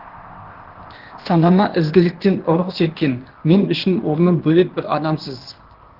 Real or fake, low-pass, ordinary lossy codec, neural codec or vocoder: fake; 5.4 kHz; Opus, 16 kbps; codec, 16 kHz, 0.8 kbps, ZipCodec